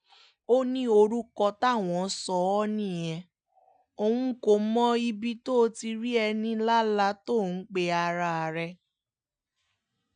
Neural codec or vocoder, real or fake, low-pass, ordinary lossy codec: none; real; 9.9 kHz; none